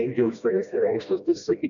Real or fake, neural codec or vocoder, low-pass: fake; codec, 16 kHz, 1 kbps, FreqCodec, smaller model; 7.2 kHz